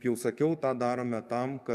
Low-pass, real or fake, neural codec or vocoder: 14.4 kHz; fake; codec, 44.1 kHz, 7.8 kbps, DAC